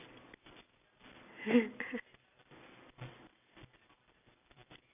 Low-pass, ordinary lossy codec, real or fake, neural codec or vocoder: 3.6 kHz; none; real; none